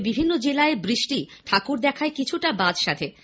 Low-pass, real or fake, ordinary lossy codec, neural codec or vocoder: none; real; none; none